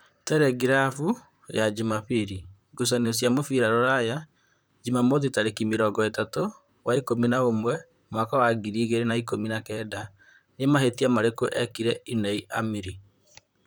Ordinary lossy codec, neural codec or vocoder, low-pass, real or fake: none; vocoder, 44.1 kHz, 128 mel bands, Pupu-Vocoder; none; fake